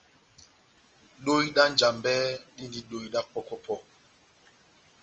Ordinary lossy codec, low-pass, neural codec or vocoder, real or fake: Opus, 24 kbps; 7.2 kHz; none; real